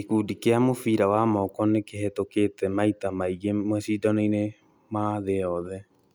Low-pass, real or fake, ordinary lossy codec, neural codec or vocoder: none; real; none; none